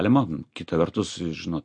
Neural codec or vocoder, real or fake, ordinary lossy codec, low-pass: none; real; MP3, 48 kbps; 9.9 kHz